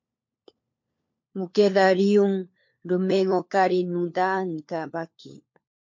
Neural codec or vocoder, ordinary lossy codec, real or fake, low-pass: codec, 16 kHz, 4 kbps, FunCodec, trained on LibriTTS, 50 frames a second; MP3, 48 kbps; fake; 7.2 kHz